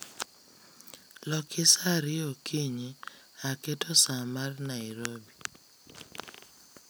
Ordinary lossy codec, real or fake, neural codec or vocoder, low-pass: none; real; none; none